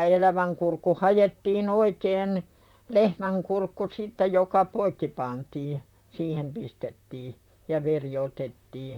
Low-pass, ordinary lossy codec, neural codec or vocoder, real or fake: 19.8 kHz; none; vocoder, 44.1 kHz, 128 mel bands, Pupu-Vocoder; fake